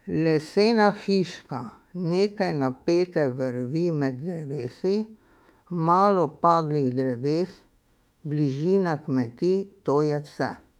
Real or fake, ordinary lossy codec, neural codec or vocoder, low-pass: fake; none; autoencoder, 48 kHz, 32 numbers a frame, DAC-VAE, trained on Japanese speech; 19.8 kHz